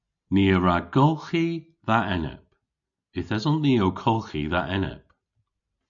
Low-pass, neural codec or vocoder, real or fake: 7.2 kHz; none; real